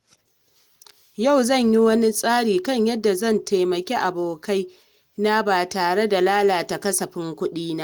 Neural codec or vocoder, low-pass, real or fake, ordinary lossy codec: none; 19.8 kHz; real; Opus, 24 kbps